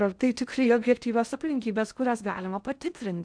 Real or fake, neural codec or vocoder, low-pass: fake; codec, 16 kHz in and 24 kHz out, 0.6 kbps, FocalCodec, streaming, 2048 codes; 9.9 kHz